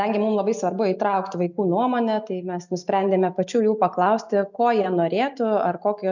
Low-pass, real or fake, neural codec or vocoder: 7.2 kHz; real; none